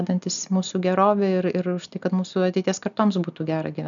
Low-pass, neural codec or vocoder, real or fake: 7.2 kHz; none; real